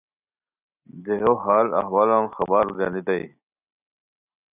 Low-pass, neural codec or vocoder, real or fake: 3.6 kHz; none; real